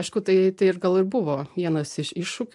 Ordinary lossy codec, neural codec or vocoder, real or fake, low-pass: MP3, 64 kbps; none; real; 10.8 kHz